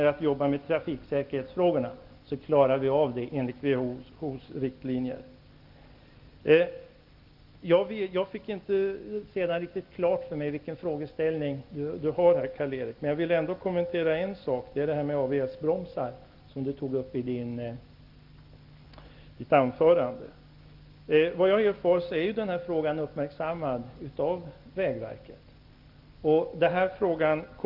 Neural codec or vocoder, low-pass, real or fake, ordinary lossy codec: none; 5.4 kHz; real; Opus, 32 kbps